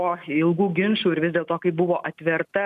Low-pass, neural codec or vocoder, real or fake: 14.4 kHz; none; real